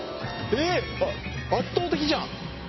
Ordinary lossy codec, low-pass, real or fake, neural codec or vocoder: MP3, 24 kbps; 7.2 kHz; real; none